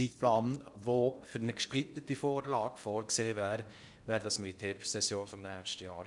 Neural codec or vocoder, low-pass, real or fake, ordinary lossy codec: codec, 16 kHz in and 24 kHz out, 0.8 kbps, FocalCodec, streaming, 65536 codes; 10.8 kHz; fake; none